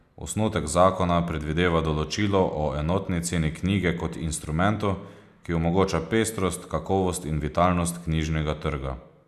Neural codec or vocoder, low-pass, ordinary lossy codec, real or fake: none; 14.4 kHz; none; real